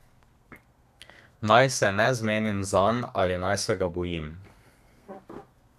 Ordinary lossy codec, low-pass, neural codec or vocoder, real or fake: none; 14.4 kHz; codec, 32 kHz, 1.9 kbps, SNAC; fake